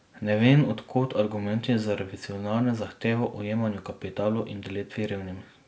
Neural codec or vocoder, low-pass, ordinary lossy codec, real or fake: none; none; none; real